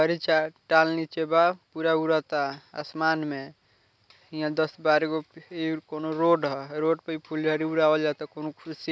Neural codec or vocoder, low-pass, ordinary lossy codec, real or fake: none; none; none; real